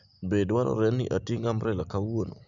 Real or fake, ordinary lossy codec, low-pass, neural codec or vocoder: real; none; 7.2 kHz; none